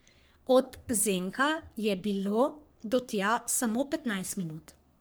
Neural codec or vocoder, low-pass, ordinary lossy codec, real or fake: codec, 44.1 kHz, 3.4 kbps, Pupu-Codec; none; none; fake